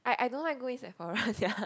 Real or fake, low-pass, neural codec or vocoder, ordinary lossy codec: real; none; none; none